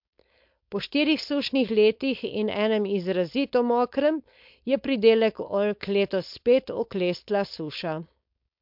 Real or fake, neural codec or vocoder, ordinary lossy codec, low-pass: fake; codec, 16 kHz, 4.8 kbps, FACodec; MP3, 48 kbps; 5.4 kHz